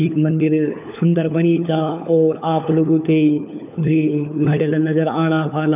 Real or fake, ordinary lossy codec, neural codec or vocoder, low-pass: fake; none; codec, 16 kHz, 4 kbps, FunCodec, trained on LibriTTS, 50 frames a second; 3.6 kHz